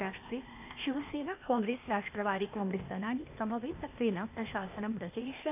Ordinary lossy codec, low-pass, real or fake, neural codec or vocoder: AAC, 32 kbps; 3.6 kHz; fake; codec, 16 kHz, 0.8 kbps, ZipCodec